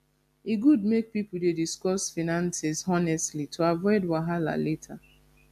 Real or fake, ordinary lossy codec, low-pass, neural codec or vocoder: real; AAC, 96 kbps; 14.4 kHz; none